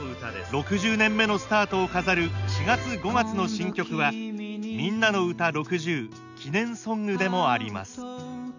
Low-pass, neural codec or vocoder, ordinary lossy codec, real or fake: 7.2 kHz; none; none; real